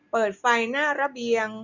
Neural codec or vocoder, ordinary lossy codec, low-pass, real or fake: none; none; 7.2 kHz; real